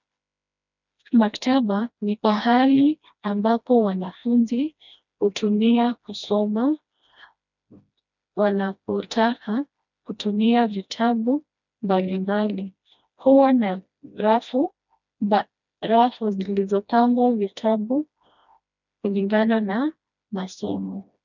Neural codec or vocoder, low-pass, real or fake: codec, 16 kHz, 1 kbps, FreqCodec, smaller model; 7.2 kHz; fake